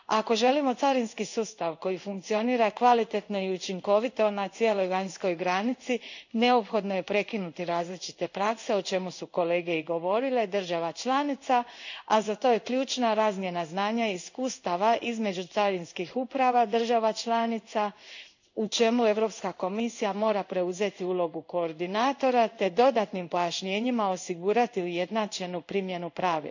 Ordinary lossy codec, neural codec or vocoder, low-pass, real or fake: none; codec, 16 kHz in and 24 kHz out, 1 kbps, XY-Tokenizer; 7.2 kHz; fake